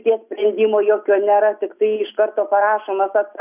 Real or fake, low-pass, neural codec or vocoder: real; 3.6 kHz; none